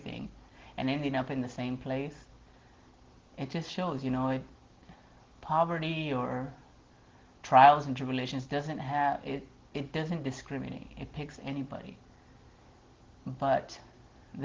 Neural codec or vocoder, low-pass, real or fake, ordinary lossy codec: none; 7.2 kHz; real; Opus, 16 kbps